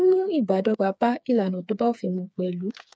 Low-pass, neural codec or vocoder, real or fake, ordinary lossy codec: none; codec, 16 kHz, 4 kbps, FreqCodec, smaller model; fake; none